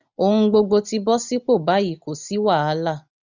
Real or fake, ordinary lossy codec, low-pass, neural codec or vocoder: real; none; 7.2 kHz; none